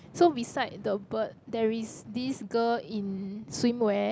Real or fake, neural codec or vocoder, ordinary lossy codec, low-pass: real; none; none; none